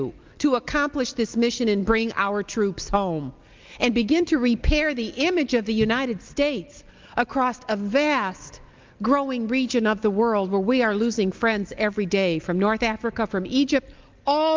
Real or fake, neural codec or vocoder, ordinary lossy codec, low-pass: real; none; Opus, 32 kbps; 7.2 kHz